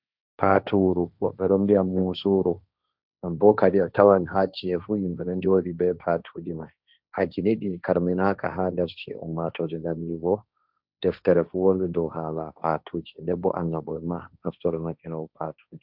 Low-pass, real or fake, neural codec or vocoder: 5.4 kHz; fake; codec, 16 kHz, 1.1 kbps, Voila-Tokenizer